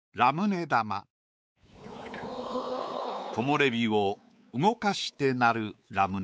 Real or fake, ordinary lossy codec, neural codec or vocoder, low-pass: fake; none; codec, 16 kHz, 4 kbps, X-Codec, WavLM features, trained on Multilingual LibriSpeech; none